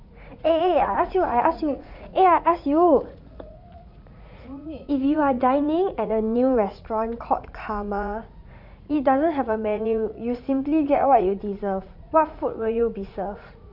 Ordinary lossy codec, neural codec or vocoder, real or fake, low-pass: none; vocoder, 44.1 kHz, 80 mel bands, Vocos; fake; 5.4 kHz